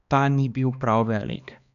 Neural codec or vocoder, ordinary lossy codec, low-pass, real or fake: codec, 16 kHz, 2 kbps, X-Codec, HuBERT features, trained on balanced general audio; none; 7.2 kHz; fake